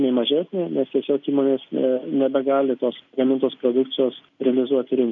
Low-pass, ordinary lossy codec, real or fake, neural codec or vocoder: 7.2 kHz; MP3, 48 kbps; real; none